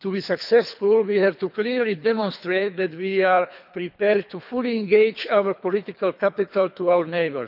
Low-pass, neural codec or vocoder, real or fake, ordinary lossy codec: 5.4 kHz; codec, 24 kHz, 3 kbps, HILCodec; fake; none